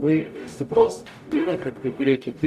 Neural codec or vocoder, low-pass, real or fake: codec, 44.1 kHz, 0.9 kbps, DAC; 14.4 kHz; fake